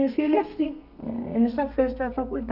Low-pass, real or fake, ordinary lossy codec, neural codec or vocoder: 5.4 kHz; fake; none; codec, 32 kHz, 1.9 kbps, SNAC